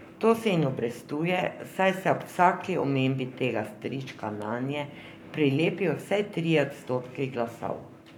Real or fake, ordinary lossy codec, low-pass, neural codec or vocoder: fake; none; none; codec, 44.1 kHz, 7.8 kbps, Pupu-Codec